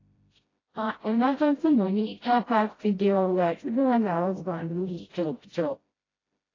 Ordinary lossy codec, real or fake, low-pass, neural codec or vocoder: AAC, 32 kbps; fake; 7.2 kHz; codec, 16 kHz, 0.5 kbps, FreqCodec, smaller model